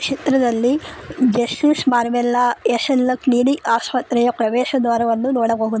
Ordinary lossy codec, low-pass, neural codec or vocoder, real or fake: none; none; none; real